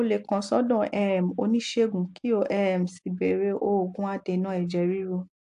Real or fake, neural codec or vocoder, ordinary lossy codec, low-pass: real; none; AAC, 64 kbps; 10.8 kHz